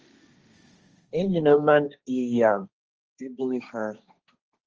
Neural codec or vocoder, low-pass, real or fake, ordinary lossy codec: codec, 16 kHz, 1.1 kbps, Voila-Tokenizer; 7.2 kHz; fake; Opus, 24 kbps